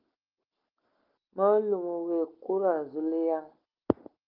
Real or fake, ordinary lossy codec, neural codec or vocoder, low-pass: real; Opus, 32 kbps; none; 5.4 kHz